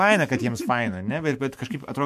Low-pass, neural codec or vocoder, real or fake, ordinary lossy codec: 14.4 kHz; autoencoder, 48 kHz, 128 numbers a frame, DAC-VAE, trained on Japanese speech; fake; MP3, 64 kbps